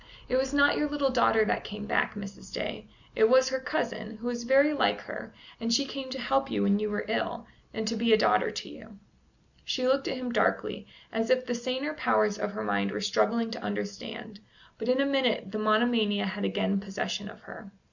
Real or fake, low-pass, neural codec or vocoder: real; 7.2 kHz; none